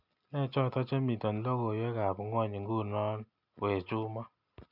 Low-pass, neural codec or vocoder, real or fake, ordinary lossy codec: 5.4 kHz; none; real; none